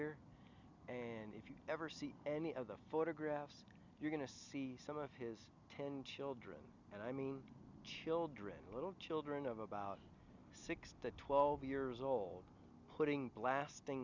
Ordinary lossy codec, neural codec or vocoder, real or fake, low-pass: Opus, 64 kbps; none; real; 7.2 kHz